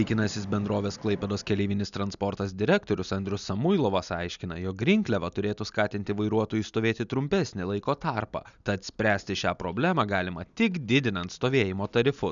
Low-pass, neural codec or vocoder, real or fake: 7.2 kHz; none; real